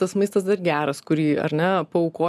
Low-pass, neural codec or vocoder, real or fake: 14.4 kHz; none; real